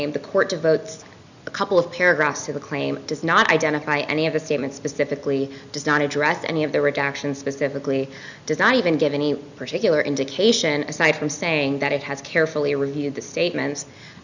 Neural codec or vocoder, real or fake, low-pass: none; real; 7.2 kHz